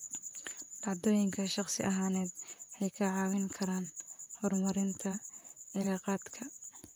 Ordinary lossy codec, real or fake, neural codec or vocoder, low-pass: none; fake; vocoder, 44.1 kHz, 128 mel bands, Pupu-Vocoder; none